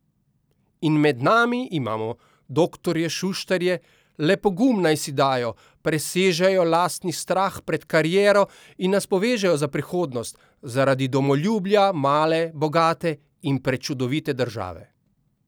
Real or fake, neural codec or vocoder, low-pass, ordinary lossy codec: real; none; none; none